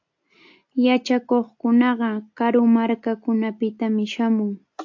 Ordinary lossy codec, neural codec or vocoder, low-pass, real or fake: AAC, 48 kbps; none; 7.2 kHz; real